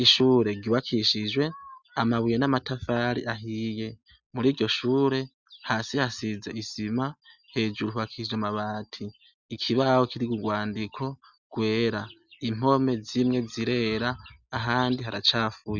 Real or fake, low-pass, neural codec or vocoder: real; 7.2 kHz; none